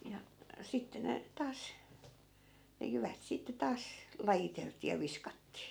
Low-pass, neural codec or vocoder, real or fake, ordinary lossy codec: none; none; real; none